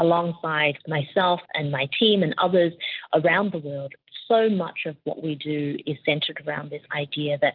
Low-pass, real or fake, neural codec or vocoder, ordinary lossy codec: 5.4 kHz; real; none; Opus, 24 kbps